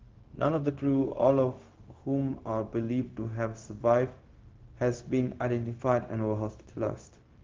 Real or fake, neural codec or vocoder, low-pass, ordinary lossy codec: fake; codec, 16 kHz, 0.4 kbps, LongCat-Audio-Codec; 7.2 kHz; Opus, 16 kbps